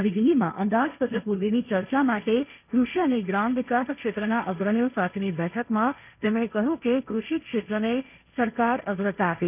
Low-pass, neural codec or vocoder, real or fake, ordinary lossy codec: 3.6 kHz; codec, 16 kHz, 1.1 kbps, Voila-Tokenizer; fake; none